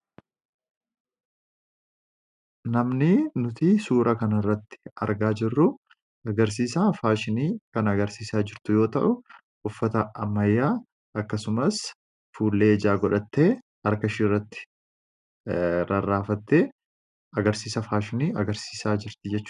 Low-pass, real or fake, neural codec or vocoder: 10.8 kHz; real; none